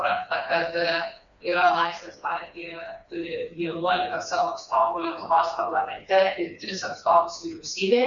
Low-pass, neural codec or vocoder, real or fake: 7.2 kHz; codec, 16 kHz, 2 kbps, FreqCodec, smaller model; fake